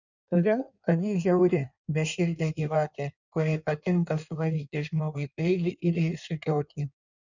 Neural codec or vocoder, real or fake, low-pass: codec, 16 kHz in and 24 kHz out, 1.1 kbps, FireRedTTS-2 codec; fake; 7.2 kHz